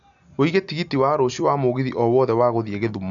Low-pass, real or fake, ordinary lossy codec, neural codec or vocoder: 7.2 kHz; real; none; none